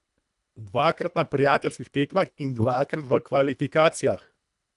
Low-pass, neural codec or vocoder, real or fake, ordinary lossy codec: 10.8 kHz; codec, 24 kHz, 1.5 kbps, HILCodec; fake; none